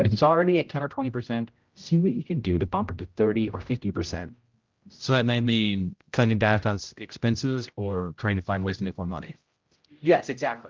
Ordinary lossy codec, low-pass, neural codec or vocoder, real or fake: Opus, 32 kbps; 7.2 kHz; codec, 16 kHz, 0.5 kbps, X-Codec, HuBERT features, trained on general audio; fake